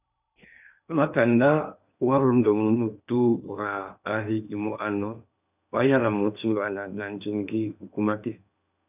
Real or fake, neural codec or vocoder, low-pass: fake; codec, 16 kHz in and 24 kHz out, 0.8 kbps, FocalCodec, streaming, 65536 codes; 3.6 kHz